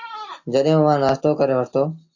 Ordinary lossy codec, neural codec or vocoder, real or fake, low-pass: AAC, 48 kbps; none; real; 7.2 kHz